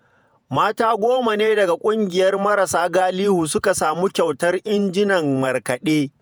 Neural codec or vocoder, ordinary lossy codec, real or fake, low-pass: vocoder, 48 kHz, 128 mel bands, Vocos; none; fake; none